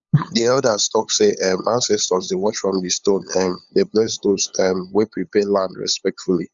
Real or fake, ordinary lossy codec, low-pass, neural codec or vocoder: fake; Opus, 64 kbps; 7.2 kHz; codec, 16 kHz, 8 kbps, FunCodec, trained on LibriTTS, 25 frames a second